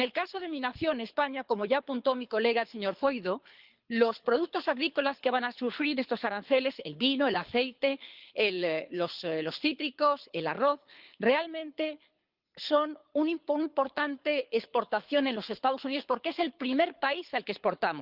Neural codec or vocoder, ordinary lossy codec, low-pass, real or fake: codec, 24 kHz, 6 kbps, HILCodec; Opus, 32 kbps; 5.4 kHz; fake